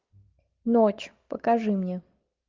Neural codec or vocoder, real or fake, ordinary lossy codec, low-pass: none; real; Opus, 32 kbps; 7.2 kHz